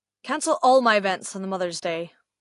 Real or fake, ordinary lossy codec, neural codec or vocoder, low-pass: real; AAC, 48 kbps; none; 10.8 kHz